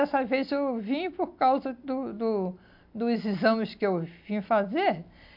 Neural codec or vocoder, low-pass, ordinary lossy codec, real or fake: none; 5.4 kHz; AAC, 48 kbps; real